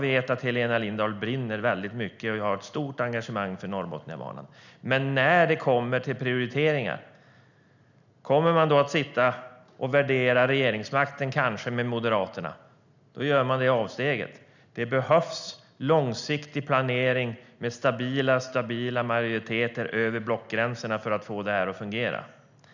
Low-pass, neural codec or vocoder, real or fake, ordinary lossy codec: 7.2 kHz; none; real; none